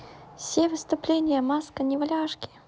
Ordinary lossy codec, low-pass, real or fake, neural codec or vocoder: none; none; real; none